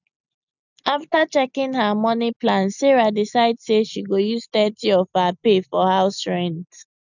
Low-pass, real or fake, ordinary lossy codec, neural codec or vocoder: 7.2 kHz; real; none; none